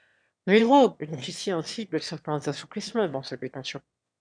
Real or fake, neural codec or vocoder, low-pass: fake; autoencoder, 22.05 kHz, a latent of 192 numbers a frame, VITS, trained on one speaker; 9.9 kHz